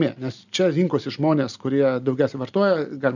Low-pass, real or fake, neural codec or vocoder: 7.2 kHz; real; none